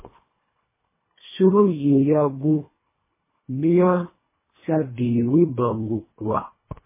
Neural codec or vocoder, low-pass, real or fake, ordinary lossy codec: codec, 24 kHz, 1.5 kbps, HILCodec; 3.6 kHz; fake; MP3, 16 kbps